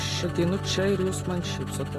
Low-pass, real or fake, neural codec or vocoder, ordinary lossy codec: 14.4 kHz; real; none; AAC, 48 kbps